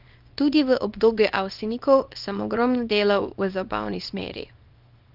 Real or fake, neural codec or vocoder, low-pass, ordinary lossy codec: fake; codec, 24 kHz, 0.9 kbps, WavTokenizer, small release; 5.4 kHz; Opus, 24 kbps